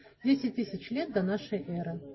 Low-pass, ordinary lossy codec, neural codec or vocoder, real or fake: 7.2 kHz; MP3, 24 kbps; none; real